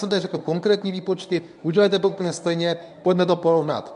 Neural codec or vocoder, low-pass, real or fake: codec, 24 kHz, 0.9 kbps, WavTokenizer, medium speech release version 1; 10.8 kHz; fake